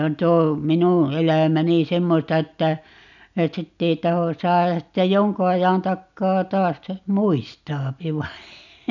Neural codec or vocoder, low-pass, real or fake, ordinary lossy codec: none; 7.2 kHz; real; none